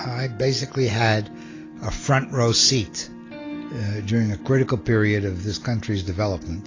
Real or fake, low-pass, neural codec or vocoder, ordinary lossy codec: real; 7.2 kHz; none; AAC, 32 kbps